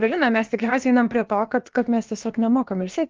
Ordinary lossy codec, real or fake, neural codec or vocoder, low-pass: Opus, 32 kbps; fake; codec, 16 kHz, about 1 kbps, DyCAST, with the encoder's durations; 7.2 kHz